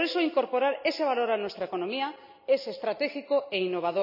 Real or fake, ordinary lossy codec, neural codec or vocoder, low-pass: real; none; none; 5.4 kHz